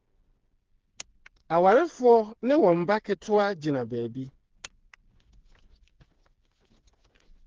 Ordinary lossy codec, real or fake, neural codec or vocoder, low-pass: Opus, 16 kbps; fake; codec, 16 kHz, 4 kbps, FreqCodec, smaller model; 7.2 kHz